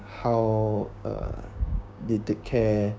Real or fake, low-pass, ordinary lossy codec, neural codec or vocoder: fake; none; none; codec, 16 kHz, 6 kbps, DAC